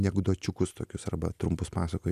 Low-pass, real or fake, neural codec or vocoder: 14.4 kHz; real; none